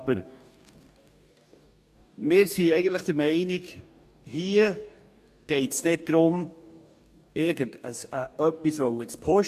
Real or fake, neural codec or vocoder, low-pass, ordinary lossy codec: fake; codec, 44.1 kHz, 2.6 kbps, DAC; 14.4 kHz; AAC, 96 kbps